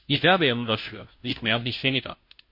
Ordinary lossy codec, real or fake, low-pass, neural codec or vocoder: MP3, 32 kbps; fake; 5.4 kHz; codec, 16 kHz, 0.5 kbps, FunCodec, trained on Chinese and English, 25 frames a second